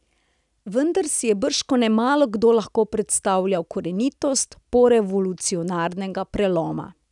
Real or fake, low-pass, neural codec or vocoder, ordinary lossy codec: real; 10.8 kHz; none; none